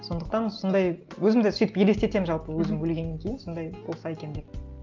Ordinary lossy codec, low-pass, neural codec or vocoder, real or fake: Opus, 24 kbps; 7.2 kHz; none; real